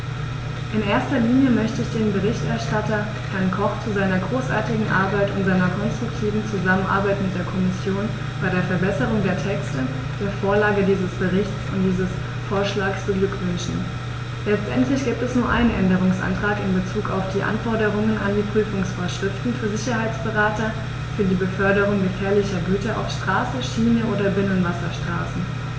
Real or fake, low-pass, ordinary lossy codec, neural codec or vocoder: real; none; none; none